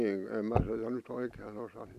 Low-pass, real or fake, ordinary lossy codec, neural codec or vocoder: 14.4 kHz; real; none; none